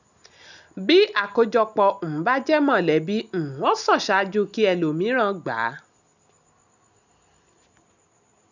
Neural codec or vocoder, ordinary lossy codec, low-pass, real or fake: none; none; 7.2 kHz; real